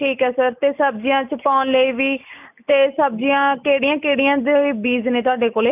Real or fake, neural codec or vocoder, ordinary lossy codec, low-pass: real; none; none; 3.6 kHz